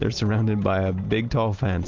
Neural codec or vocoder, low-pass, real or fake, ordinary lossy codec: none; 7.2 kHz; real; Opus, 32 kbps